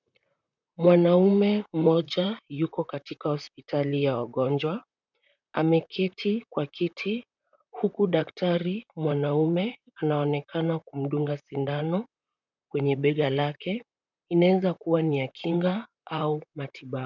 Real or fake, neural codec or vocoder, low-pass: fake; vocoder, 44.1 kHz, 128 mel bands, Pupu-Vocoder; 7.2 kHz